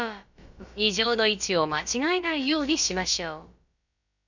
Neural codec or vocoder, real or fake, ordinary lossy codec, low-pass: codec, 16 kHz, about 1 kbps, DyCAST, with the encoder's durations; fake; none; 7.2 kHz